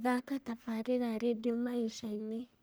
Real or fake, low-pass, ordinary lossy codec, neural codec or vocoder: fake; none; none; codec, 44.1 kHz, 1.7 kbps, Pupu-Codec